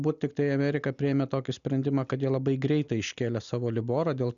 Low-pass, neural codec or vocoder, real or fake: 7.2 kHz; none; real